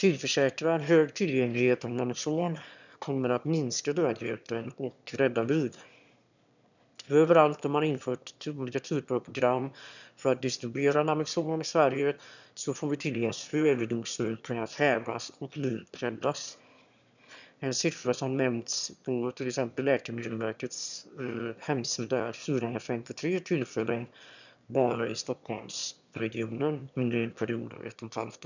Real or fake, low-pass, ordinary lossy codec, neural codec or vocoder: fake; 7.2 kHz; none; autoencoder, 22.05 kHz, a latent of 192 numbers a frame, VITS, trained on one speaker